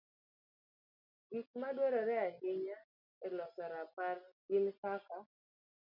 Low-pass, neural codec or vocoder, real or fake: 5.4 kHz; none; real